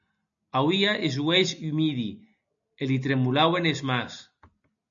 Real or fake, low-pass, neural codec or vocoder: real; 7.2 kHz; none